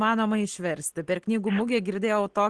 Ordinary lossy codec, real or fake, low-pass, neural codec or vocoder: Opus, 16 kbps; real; 10.8 kHz; none